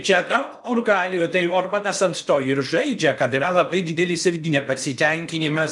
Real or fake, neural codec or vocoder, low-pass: fake; codec, 16 kHz in and 24 kHz out, 0.8 kbps, FocalCodec, streaming, 65536 codes; 10.8 kHz